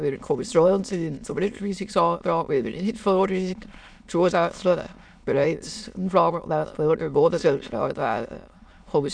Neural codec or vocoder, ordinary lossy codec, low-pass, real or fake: autoencoder, 22.05 kHz, a latent of 192 numbers a frame, VITS, trained on many speakers; none; 9.9 kHz; fake